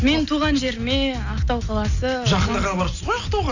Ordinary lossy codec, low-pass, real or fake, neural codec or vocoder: none; 7.2 kHz; real; none